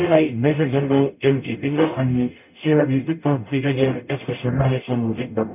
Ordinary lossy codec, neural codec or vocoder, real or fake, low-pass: none; codec, 44.1 kHz, 0.9 kbps, DAC; fake; 3.6 kHz